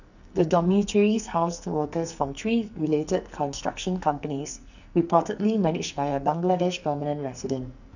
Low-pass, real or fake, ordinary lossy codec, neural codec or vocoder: 7.2 kHz; fake; none; codec, 44.1 kHz, 2.6 kbps, SNAC